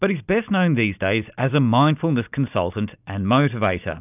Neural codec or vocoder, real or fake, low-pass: none; real; 3.6 kHz